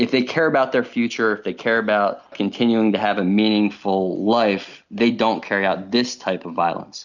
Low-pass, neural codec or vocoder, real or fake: 7.2 kHz; none; real